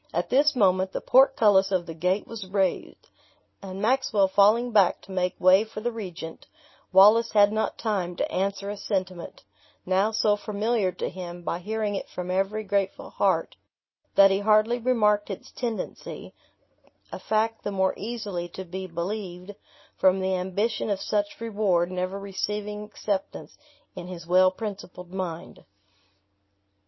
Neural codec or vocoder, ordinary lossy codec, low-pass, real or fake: none; MP3, 24 kbps; 7.2 kHz; real